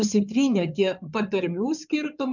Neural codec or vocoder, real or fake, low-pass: codec, 16 kHz in and 24 kHz out, 2.2 kbps, FireRedTTS-2 codec; fake; 7.2 kHz